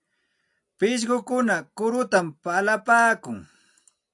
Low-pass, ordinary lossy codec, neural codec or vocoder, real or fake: 10.8 kHz; AAC, 64 kbps; none; real